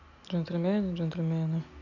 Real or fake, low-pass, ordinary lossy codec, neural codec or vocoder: real; 7.2 kHz; none; none